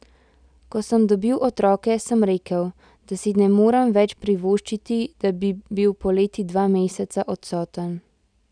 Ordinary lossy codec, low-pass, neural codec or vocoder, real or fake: none; 9.9 kHz; none; real